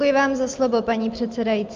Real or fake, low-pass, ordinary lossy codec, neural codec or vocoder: real; 7.2 kHz; Opus, 24 kbps; none